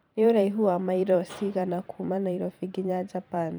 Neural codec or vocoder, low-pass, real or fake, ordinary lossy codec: vocoder, 44.1 kHz, 128 mel bands every 512 samples, BigVGAN v2; none; fake; none